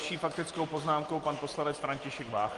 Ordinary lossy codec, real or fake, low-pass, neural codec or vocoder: Opus, 24 kbps; real; 10.8 kHz; none